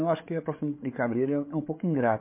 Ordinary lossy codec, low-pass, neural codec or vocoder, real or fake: MP3, 24 kbps; 3.6 kHz; codec, 16 kHz, 8 kbps, FreqCodec, larger model; fake